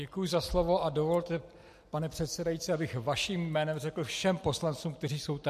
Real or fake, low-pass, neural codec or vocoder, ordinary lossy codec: real; 14.4 kHz; none; MP3, 64 kbps